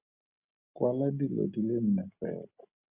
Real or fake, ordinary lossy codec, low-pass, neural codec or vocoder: real; Opus, 64 kbps; 3.6 kHz; none